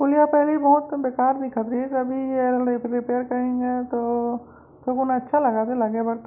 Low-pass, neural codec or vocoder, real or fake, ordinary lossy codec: 3.6 kHz; none; real; none